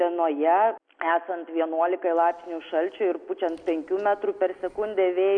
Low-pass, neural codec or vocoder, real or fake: 9.9 kHz; none; real